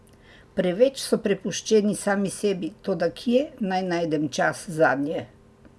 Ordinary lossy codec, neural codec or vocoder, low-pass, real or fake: none; none; none; real